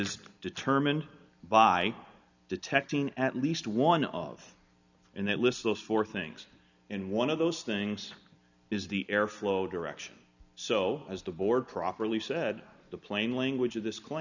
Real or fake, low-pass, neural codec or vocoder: real; 7.2 kHz; none